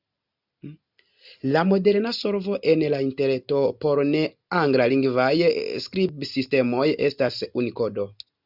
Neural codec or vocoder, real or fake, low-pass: none; real; 5.4 kHz